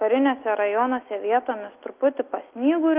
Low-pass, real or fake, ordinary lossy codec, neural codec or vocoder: 3.6 kHz; real; Opus, 32 kbps; none